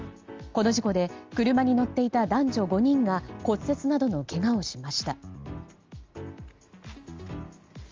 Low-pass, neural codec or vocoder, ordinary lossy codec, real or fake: 7.2 kHz; none; Opus, 32 kbps; real